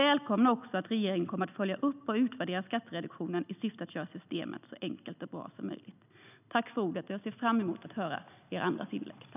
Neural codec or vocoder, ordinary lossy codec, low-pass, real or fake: none; none; 3.6 kHz; real